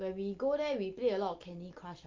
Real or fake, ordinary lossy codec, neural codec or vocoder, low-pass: real; Opus, 32 kbps; none; 7.2 kHz